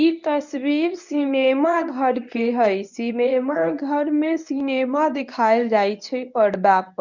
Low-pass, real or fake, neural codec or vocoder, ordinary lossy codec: 7.2 kHz; fake; codec, 24 kHz, 0.9 kbps, WavTokenizer, medium speech release version 1; none